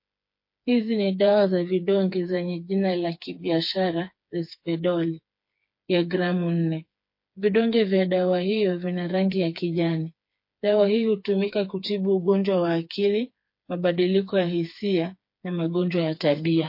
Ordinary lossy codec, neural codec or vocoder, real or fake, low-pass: MP3, 32 kbps; codec, 16 kHz, 4 kbps, FreqCodec, smaller model; fake; 5.4 kHz